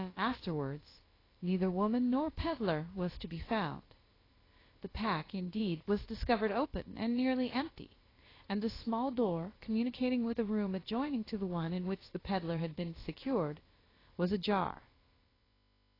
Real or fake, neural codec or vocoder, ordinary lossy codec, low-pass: fake; codec, 16 kHz, about 1 kbps, DyCAST, with the encoder's durations; AAC, 24 kbps; 5.4 kHz